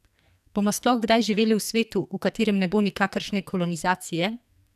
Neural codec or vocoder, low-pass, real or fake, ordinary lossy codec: codec, 44.1 kHz, 2.6 kbps, SNAC; 14.4 kHz; fake; none